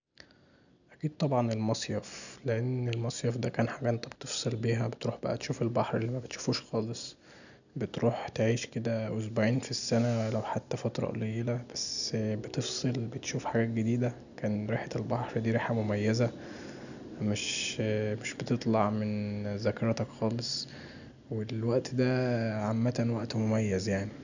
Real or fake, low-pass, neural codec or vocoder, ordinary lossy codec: real; 7.2 kHz; none; none